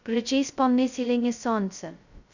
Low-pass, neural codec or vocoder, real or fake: 7.2 kHz; codec, 16 kHz, 0.2 kbps, FocalCodec; fake